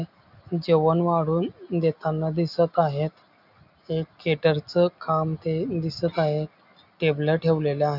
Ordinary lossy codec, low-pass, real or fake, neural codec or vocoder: none; 5.4 kHz; real; none